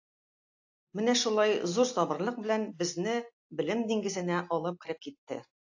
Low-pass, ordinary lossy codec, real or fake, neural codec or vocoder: 7.2 kHz; AAC, 48 kbps; real; none